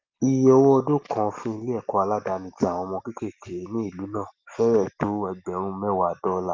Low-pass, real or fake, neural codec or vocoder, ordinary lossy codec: 7.2 kHz; real; none; Opus, 32 kbps